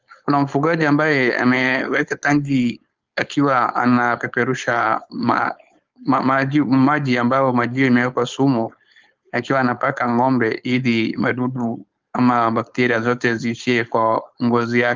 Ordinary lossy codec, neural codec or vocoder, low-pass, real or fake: Opus, 32 kbps; codec, 16 kHz, 4.8 kbps, FACodec; 7.2 kHz; fake